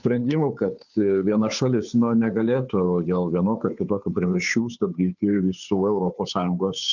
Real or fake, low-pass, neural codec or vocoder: fake; 7.2 kHz; codec, 16 kHz, 2 kbps, FunCodec, trained on Chinese and English, 25 frames a second